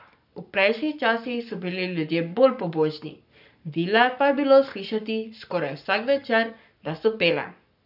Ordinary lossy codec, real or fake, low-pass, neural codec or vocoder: none; fake; 5.4 kHz; codec, 44.1 kHz, 7.8 kbps, Pupu-Codec